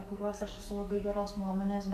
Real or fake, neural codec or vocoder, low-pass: fake; codec, 44.1 kHz, 2.6 kbps, SNAC; 14.4 kHz